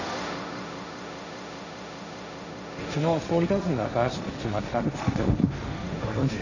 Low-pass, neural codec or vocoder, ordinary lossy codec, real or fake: 7.2 kHz; codec, 16 kHz, 1.1 kbps, Voila-Tokenizer; none; fake